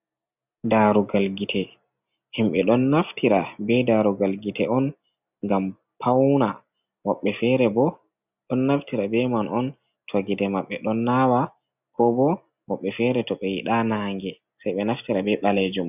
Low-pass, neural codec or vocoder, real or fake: 3.6 kHz; none; real